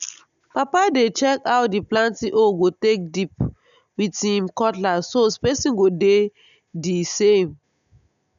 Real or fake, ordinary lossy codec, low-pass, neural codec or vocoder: real; none; 7.2 kHz; none